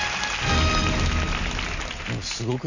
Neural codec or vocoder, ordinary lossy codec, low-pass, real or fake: none; none; 7.2 kHz; real